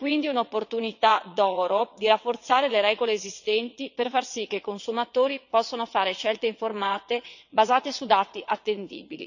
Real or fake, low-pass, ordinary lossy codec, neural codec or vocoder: fake; 7.2 kHz; none; vocoder, 22.05 kHz, 80 mel bands, WaveNeXt